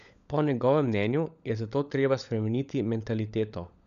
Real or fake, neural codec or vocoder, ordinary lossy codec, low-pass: fake; codec, 16 kHz, 16 kbps, FunCodec, trained on LibriTTS, 50 frames a second; none; 7.2 kHz